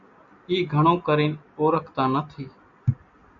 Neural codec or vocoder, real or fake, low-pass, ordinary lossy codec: none; real; 7.2 kHz; MP3, 64 kbps